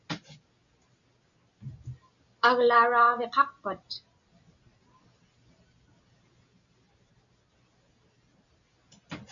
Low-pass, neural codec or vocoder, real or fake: 7.2 kHz; none; real